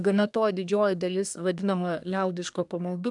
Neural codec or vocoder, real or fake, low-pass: codec, 24 kHz, 1 kbps, SNAC; fake; 10.8 kHz